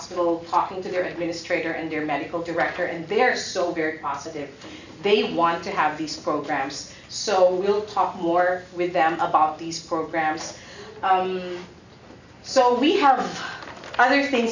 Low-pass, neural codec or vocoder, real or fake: 7.2 kHz; none; real